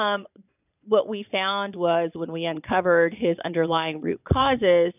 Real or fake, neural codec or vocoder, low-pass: fake; vocoder, 22.05 kHz, 80 mel bands, Vocos; 3.6 kHz